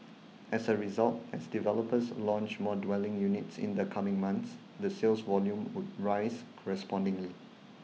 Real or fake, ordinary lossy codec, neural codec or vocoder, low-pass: real; none; none; none